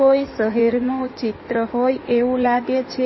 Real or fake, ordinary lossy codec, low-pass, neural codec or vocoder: fake; MP3, 24 kbps; 7.2 kHz; codec, 16 kHz in and 24 kHz out, 2.2 kbps, FireRedTTS-2 codec